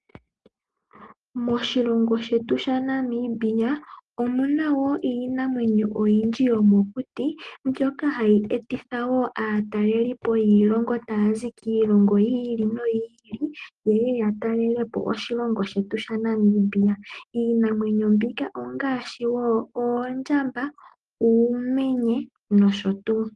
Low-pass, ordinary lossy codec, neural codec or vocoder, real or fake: 10.8 kHz; Opus, 24 kbps; none; real